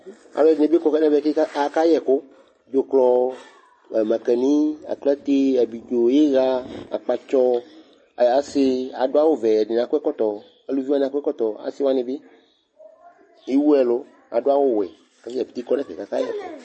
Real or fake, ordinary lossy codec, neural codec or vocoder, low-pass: real; MP3, 32 kbps; none; 9.9 kHz